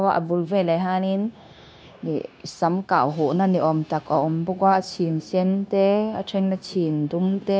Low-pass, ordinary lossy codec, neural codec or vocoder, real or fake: none; none; codec, 16 kHz, 0.9 kbps, LongCat-Audio-Codec; fake